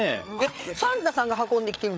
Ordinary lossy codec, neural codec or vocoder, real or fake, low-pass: none; codec, 16 kHz, 8 kbps, FreqCodec, larger model; fake; none